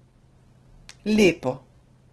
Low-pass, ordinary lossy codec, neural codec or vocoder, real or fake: 10.8 kHz; Opus, 16 kbps; none; real